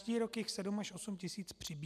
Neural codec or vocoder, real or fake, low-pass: none; real; 14.4 kHz